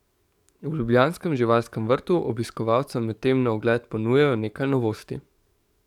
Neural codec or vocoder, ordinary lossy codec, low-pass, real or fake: codec, 44.1 kHz, 7.8 kbps, DAC; none; 19.8 kHz; fake